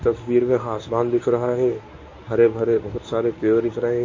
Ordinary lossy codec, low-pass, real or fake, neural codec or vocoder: MP3, 32 kbps; 7.2 kHz; fake; codec, 24 kHz, 0.9 kbps, WavTokenizer, medium speech release version 1